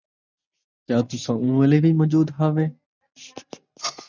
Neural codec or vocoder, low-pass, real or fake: none; 7.2 kHz; real